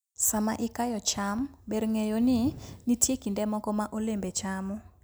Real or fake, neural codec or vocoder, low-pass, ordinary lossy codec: real; none; none; none